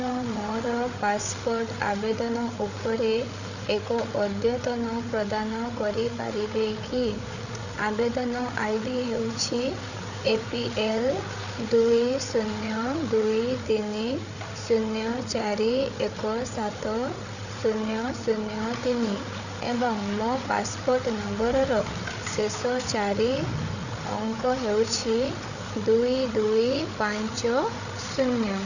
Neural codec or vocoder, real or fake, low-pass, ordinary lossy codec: codec, 16 kHz, 8 kbps, FreqCodec, larger model; fake; 7.2 kHz; none